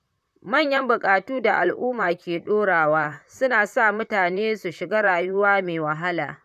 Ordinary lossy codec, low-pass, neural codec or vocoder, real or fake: none; 14.4 kHz; vocoder, 44.1 kHz, 128 mel bands, Pupu-Vocoder; fake